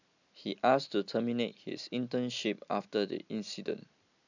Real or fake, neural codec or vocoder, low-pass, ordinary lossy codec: real; none; 7.2 kHz; none